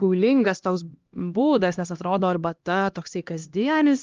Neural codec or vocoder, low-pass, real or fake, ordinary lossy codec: codec, 16 kHz, 1 kbps, X-Codec, HuBERT features, trained on LibriSpeech; 7.2 kHz; fake; Opus, 32 kbps